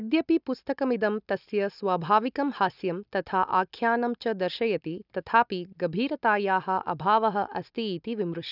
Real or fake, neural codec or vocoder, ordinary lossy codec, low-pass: real; none; none; 5.4 kHz